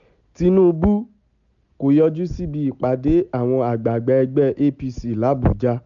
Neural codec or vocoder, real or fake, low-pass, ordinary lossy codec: none; real; 7.2 kHz; none